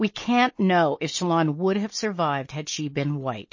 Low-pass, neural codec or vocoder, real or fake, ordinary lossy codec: 7.2 kHz; none; real; MP3, 32 kbps